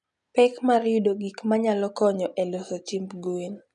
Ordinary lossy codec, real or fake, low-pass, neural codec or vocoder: none; real; 10.8 kHz; none